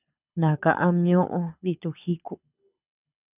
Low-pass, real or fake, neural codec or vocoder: 3.6 kHz; fake; codec, 24 kHz, 6 kbps, HILCodec